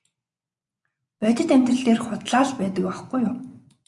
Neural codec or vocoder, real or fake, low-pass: vocoder, 24 kHz, 100 mel bands, Vocos; fake; 10.8 kHz